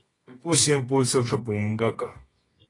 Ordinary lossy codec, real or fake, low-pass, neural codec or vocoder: AAC, 32 kbps; fake; 10.8 kHz; codec, 24 kHz, 0.9 kbps, WavTokenizer, medium music audio release